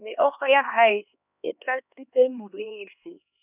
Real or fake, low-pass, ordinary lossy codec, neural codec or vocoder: fake; 3.6 kHz; none; codec, 16 kHz, 2 kbps, X-Codec, HuBERT features, trained on LibriSpeech